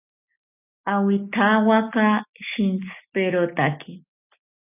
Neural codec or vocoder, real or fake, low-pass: none; real; 3.6 kHz